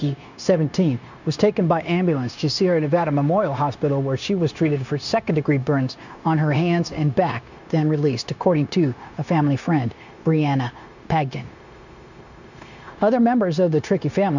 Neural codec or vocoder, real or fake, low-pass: codec, 16 kHz, 0.9 kbps, LongCat-Audio-Codec; fake; 7.2 kHz